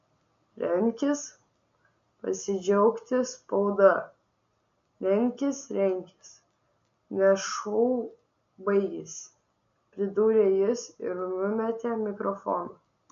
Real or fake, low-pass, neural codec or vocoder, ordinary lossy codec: real; 7.2 kHz; none; MP3, 48 kbps